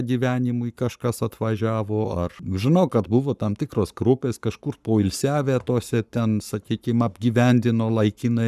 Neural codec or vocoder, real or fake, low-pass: codec, 44.1 kHz, 7.8 kbps, Pupu-Codec; fake; 14.4 kHz